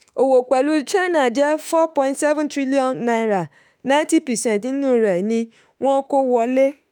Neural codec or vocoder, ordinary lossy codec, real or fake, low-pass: autoencoder, 48 kHz, 32 numbers a frame, DAC-VAE, trained on Japanese speech; none; fake; none